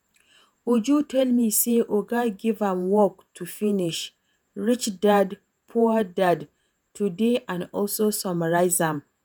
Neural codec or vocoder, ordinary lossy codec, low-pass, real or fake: vocoder, 48 kHz, 128 mel bands, Vocos; none; none; fake